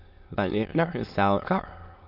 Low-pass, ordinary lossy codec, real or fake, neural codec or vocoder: 5.4 kHz; MP3, 48 kbps; fake; autoencoder, 22.05 kHz, a latent of 192 numbers a frame, VITS, trained on many speakers